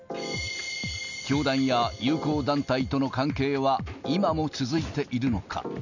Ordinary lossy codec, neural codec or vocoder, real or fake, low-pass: none; none; real; 7.2 kHz